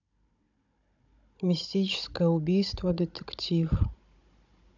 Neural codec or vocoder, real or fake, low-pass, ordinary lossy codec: codec, 16 kHz, 16 kbps, FunCodec, trained on Chinese and English, 50 frames a second; fake; 7.2 kHz; none